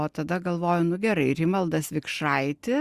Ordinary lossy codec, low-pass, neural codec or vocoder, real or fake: Opus, 64 kbps; 14.4 kHz; none; real